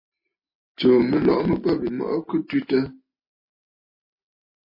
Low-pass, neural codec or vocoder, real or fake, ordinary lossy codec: 5.4 kHz; none; real; MP3, 24 kbps